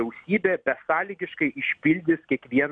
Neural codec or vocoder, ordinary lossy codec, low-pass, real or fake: none; MP3, 96 kbps; 9.9 kHz; real